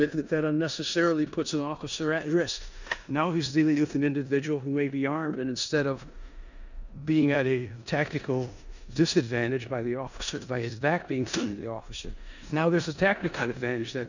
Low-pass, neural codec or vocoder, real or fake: 7.2 kHz; codec, 16 kHz in and 24 kHz out, 0.9 kbps, LongCat-Audio-Codec, four codebook decoder; fake